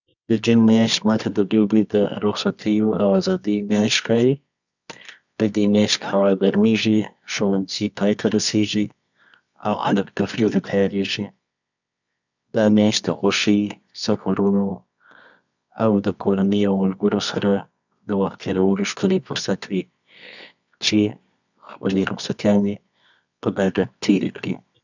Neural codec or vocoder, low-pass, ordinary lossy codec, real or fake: codec, 24 kHz, 0.9 kbps, WavTokenizer, medium music audio release; 7.2 kHz; none; fake